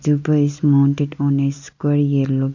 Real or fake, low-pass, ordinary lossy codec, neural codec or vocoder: real; 7.2 kHz; none; none